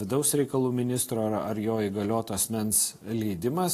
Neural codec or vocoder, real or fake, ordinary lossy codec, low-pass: none; real; AAC, 48 kbps; 14.4 kHz